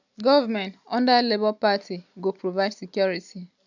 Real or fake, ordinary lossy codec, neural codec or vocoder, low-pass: real; none; none; 7.2 kHz